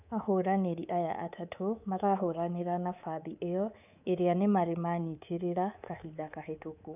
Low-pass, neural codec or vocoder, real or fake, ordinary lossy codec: 3.6 kHz; codec, 24 kHz, 3.1 kbps, DualCodec; fake; none